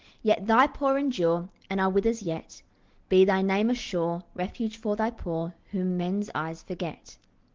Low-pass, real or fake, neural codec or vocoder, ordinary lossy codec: 7.2 kHz; real; none; Opus, 16 kbps